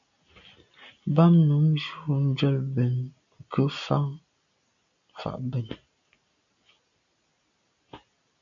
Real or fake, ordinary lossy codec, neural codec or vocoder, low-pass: real; AAC, 64 kbps; none; 7.2 kHz